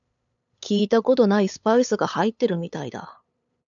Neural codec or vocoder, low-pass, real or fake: codec, 16 kHz, 8 kbps, FunCodec, trained on LibriTTS, 25 frames a second; 7.2 kHz; fake